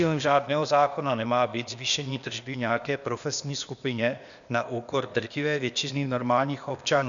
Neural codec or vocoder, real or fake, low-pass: codec, 16 kHz, 0.8 kbps, ZipCodec; fake; 7.2 kHz